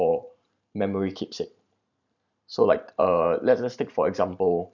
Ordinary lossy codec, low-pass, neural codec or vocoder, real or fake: none; 7.2 kHz; vocoder, 44.1 kHz, 128 mel bands every 512 samples, BigVGAN v2; fake